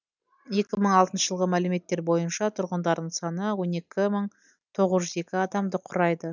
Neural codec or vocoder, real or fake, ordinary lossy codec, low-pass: none; real; none; 7.2 kHz